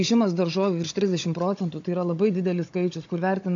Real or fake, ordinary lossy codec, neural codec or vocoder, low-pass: fake; AAC, 48 kbps; codec, 16 kHz, 16 kbps, FunCodec, trained on Chinese and English, 50 frames a second; 7.2 kHz